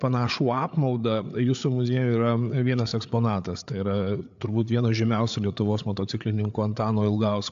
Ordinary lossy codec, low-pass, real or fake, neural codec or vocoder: AAC, 64 kbps; 7.2 kHz; fake; codec, 16 kHz, 8 kbps, FreqCodec, larger model